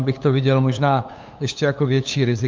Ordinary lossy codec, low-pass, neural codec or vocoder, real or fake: Opus, 24 kbps; 7.2 kHz; codec, 16 kHz, 8 kbps, FunCodec, trained on LibriTTS, 25 frames a second; fake